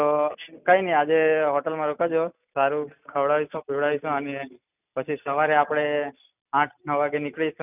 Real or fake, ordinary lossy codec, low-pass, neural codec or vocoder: real; none; 3.6 kHz; none